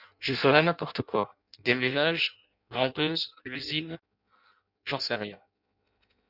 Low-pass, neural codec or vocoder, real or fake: 5.4 kHz; codec, 16 kHz in and 24 kHz out, 0.6 kbps, FireRedTTS-2 codec; fake